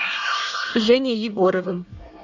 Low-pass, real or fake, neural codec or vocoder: 7.2 kHz; fake; codec, 24 kHz, 1 kbps, SNAC